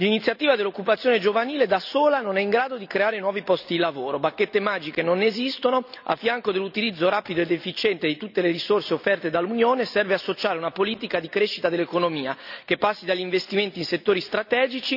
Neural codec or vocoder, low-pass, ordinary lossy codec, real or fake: none; 5.4 kHz; none; real